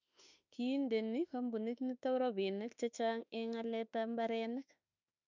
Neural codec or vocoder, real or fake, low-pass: autoencoder, 48 kHz, 32 numbers a frame, DAC-VAE, trained on Japanese speech; fake; 7.2 kHz